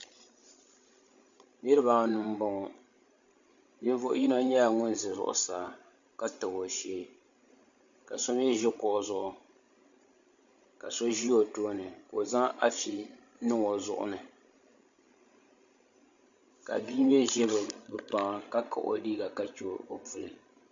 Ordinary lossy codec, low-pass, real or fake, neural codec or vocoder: MP3, 64 kbps; 7.2 kHz; fake; codec, 16 kHz, 16 kbps, FreqCodec, larger model